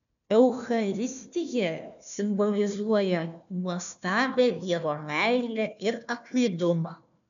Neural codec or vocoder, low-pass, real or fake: codec, 16 kHz, 1 kbps, FunCodec, trained on Chinese and English, 50 frames a second; 7.2 kHz; fake